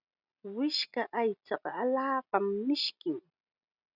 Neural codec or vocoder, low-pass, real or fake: none; 5.4 kHz; real